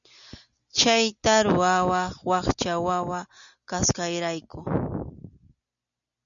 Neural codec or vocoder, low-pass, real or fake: none; 7.2 kHz; real